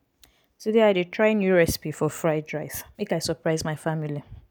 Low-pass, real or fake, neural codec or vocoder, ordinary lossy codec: none; real; none; none